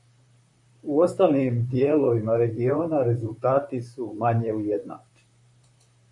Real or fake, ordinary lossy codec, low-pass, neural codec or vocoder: fake; AAC, 64 kbps; 10.8 kHz; vocoder, 44.1 kHz, 128 mel bands, Pupu-Vocoder